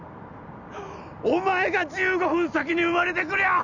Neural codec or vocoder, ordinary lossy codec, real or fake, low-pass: none; none; real; 7.2 kHz